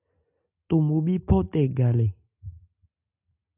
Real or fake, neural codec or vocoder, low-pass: real; none; 3.6 kHz